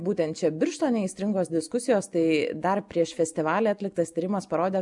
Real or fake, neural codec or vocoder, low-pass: real; none; 10.8 kHz